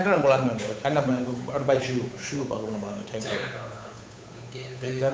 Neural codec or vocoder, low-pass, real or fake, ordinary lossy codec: codec, 16 kHz, 8 kbps, FunCodec, trained on Chinese and English, 25 frames a second; none; fake; none